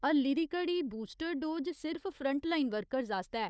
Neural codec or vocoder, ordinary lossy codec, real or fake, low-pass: codec, 16 kHz, 4 kbps, FunCodec, trained on Chinese and English, 50 frames a second; none; fake; none